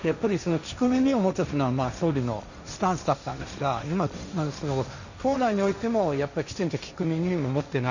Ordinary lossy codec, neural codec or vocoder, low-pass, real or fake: none; codec, 16 kHz, 1.1 kbps, Voila-Tokenizer; 7.2 kHz; fake